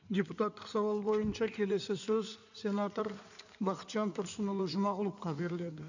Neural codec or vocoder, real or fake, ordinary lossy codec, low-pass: codec, 16 kHz in and 24 kHz out, 2.2 kbps, FireRedTTS-2 codec; fake; none; 7.2 kHz